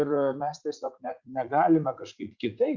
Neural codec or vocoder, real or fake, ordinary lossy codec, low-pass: vocoder, 44.1 kHz, 80 mel bands, Vocos; fake; Opus, 64 kbps; 7.2 kHz